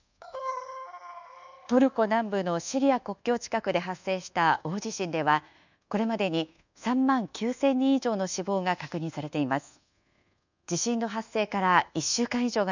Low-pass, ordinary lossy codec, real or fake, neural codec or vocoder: 7.2 kHz; none; fake; codec, 24 kHz, 1.2 kbps, DualCodec